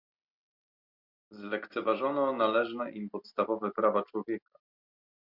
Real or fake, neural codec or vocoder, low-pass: real; none; 5.4 kHz